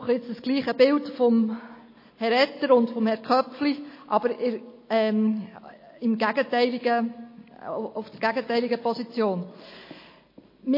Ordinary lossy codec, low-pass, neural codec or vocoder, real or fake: MP3, 24 kbps; 5.4 kHz; none; real